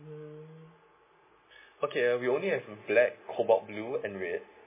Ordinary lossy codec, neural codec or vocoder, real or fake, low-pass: MP3, 16 kbps; none; real; 3.6 kHz